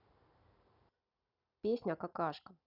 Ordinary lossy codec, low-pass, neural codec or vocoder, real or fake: none; 5.4 kHz; none; real